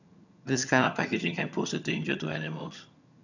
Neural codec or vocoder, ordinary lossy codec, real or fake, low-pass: vocoder, 22.05 kHz, 80 mel bands, HiFi-GAN; none; fake; 7.2 kHz